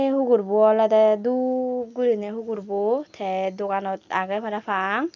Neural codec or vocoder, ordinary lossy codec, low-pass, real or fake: none; none; 7.2 kHz; real